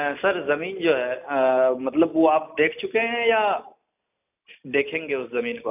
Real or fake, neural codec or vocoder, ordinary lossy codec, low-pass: real; none; none; 3.6 kHz